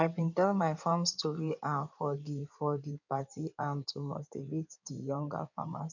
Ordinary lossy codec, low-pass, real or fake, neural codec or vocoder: none; 7.2 kHz; fake; codec, 16 kHz in and 24 kHz out, 2.2 kbps, FireRedTTS-2 codec